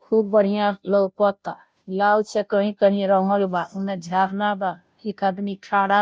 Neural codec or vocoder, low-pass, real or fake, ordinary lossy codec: codec, 16 kHz, 0.5 kbps, FunCodec, trained on Chinese and English, 25 frames a second; none; fake; none